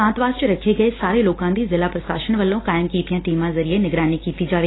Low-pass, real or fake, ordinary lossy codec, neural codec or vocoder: 7.2 kHz; real; AAC, 16 kbps; none